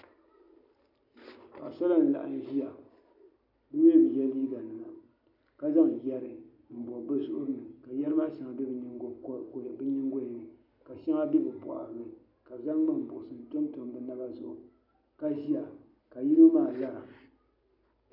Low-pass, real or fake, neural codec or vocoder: 5.4 kHz; real; none